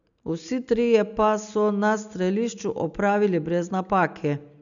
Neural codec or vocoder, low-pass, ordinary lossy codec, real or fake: none; 7.2 kHz; none; real